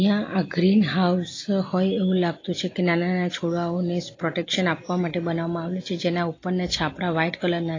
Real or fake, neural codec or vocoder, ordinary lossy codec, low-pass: real; none; AAC, 32 kbps; 7.2 kHz